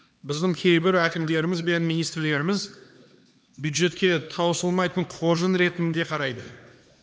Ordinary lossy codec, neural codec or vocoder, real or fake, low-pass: none; codec, 16 kHz, 2 kbps, X-Codec, HuBERT features, trained on LibriSpeech; fake; none